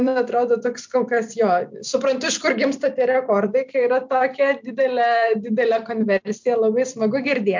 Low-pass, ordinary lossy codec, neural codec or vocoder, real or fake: 7.2 kHz; MP3, 64 kbps; vocoder, 44.1 kHz, 128 mel bands every 256 samples, BigVGAN v2; fake